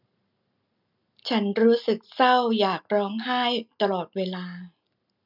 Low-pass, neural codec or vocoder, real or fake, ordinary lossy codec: 5.4 kHz; none; real; none